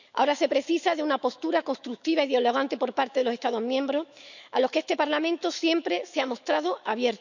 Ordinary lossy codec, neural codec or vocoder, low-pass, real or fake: none; autoencoder, 48 kHz, 128 numbers a frame, DAC-VAE, trained on Japanese speech; 7.2 kHz; fake